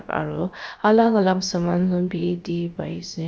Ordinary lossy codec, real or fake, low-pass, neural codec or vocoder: none; fake; none; codec, 16 kHz, about 1 kbps, DyCAST, with the encoder's durations